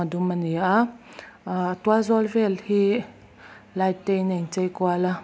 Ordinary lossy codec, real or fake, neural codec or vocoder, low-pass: none; real; none; none